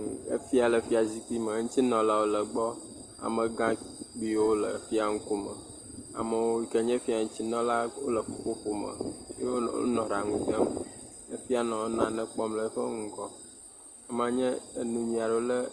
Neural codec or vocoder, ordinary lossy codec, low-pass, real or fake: none; Opus, 24 kbps; 9.9 kHz; real